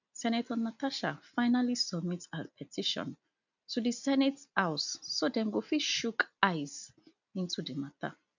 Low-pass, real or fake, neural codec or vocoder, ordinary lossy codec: 7.2 kHz; real; none; none